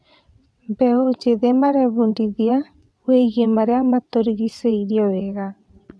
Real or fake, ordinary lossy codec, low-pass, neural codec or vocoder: fake; none; none; vocoder, 22.05 kHz, 80 mel bands, WaveNeXt